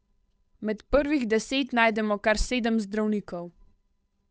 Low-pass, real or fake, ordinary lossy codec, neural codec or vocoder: none; fake; none; codec, 16 kHz, 8 kbps, FunCodec, trained on Chinese and English, 25 frames a second